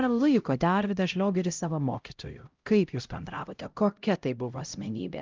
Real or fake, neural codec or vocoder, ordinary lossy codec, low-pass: fake; codec, 16 kHz, 0.5 kbps, X-Codec, HuBERT features, trained on LibriSpeech; Opus, 24 kbps; 7.2 kHz